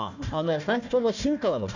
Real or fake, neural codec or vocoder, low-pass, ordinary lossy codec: fake; codec, 16 kHz, 1 kbps, FunCodec, trained on Chinese and English, 50 frames a second; 7.2 kHz; none